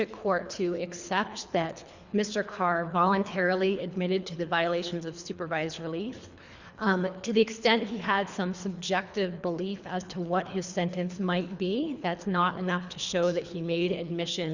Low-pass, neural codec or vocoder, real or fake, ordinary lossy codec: 7.2 kHz; codec, 24 kHz, 3 kbps, HILCodec; fake; Opus, 64 kbps